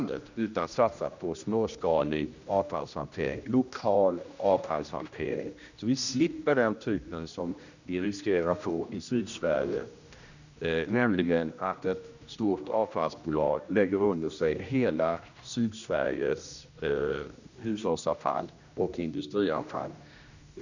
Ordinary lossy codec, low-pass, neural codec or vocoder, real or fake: none; 7.2 kHz; codec, 16 kHz, 1 kbps, X-Codec, HuBERT features, trained on general audio; fake